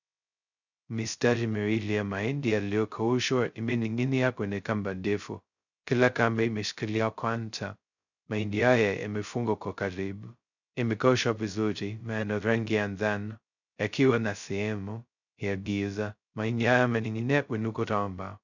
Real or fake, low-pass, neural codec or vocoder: fake; 7.2 kHz; codec, 16 kHz, 0.2 kbps, FocalCodec